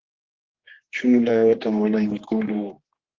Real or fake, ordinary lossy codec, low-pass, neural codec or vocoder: fake; Opus, 16 kbps; 7.2 kHz; codec, 16 kHz, 2 kbps, X-Codec, HuBERT features, trained on general audio